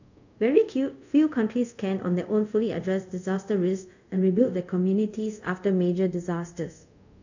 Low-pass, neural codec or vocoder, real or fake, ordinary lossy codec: 7.2 kHz; codec, 24 kHz, 0.5 kbps, DualCodec; fake; none